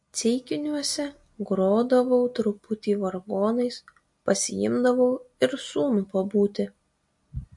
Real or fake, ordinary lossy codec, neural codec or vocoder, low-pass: real; MP3, 48 kbps; none; 10.8 kHz